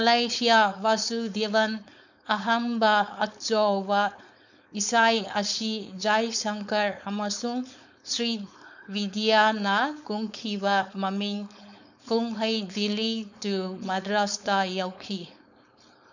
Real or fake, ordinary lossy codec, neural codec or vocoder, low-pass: fake; none; codec, 16 kHz, 4.8 kbps, FACodec; 7.2 kHz